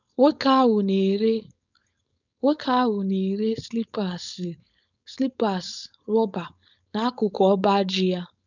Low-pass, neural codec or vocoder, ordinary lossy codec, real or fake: 7.2 kHz; codec, 16 kHz, 4.8 kbps, FACodec; none; fake